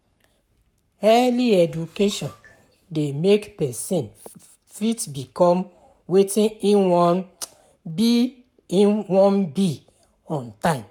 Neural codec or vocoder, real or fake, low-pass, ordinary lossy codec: codec, 44.1 kHz, 7.8 kbps, Pupu-Codec; fake; 19.8 kHz; none